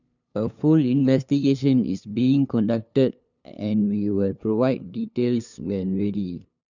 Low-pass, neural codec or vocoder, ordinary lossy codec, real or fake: 7.2 kHz; codec, 16 kHz, 2 kbps, FunCodec, trained on LibriTTS, 25 frames a second; none; fake